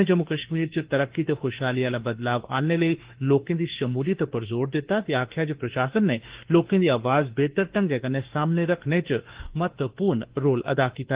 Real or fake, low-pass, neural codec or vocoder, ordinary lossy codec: fake; 3.6 kHz; autoencoder, 48 kHz, 32 numbers a frame, DAC-VAE, trained on Japanese speech; Opus, 16 kbps